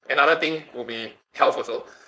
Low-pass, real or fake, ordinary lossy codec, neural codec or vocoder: none; fake; none; codec, 16 kHz, 4.8 kbps, FACodec